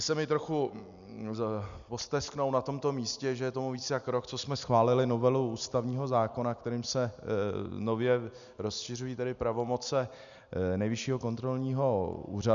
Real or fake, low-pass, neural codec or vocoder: real; 7.2 kHz; none